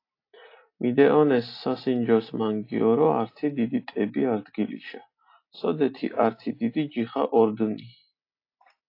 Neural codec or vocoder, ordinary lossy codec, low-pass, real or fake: none; AAC, 32 kbps; 5.4 kHz; real